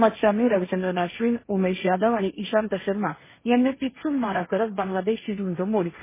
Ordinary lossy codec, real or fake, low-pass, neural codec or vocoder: MP3, 16 kbps; fake; 3.6 kHz; codec, 16 kHz, 1.1 kbps, Voila-Tokenizer